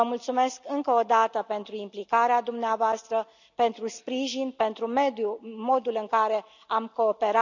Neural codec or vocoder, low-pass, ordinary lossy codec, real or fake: none; 7.2 kHz; none; real